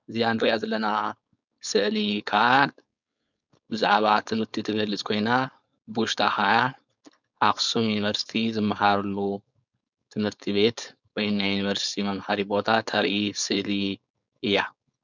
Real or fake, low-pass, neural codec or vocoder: fake; 7.2 kHz; codec, 16 kHz, 4.8 kbps, FACodec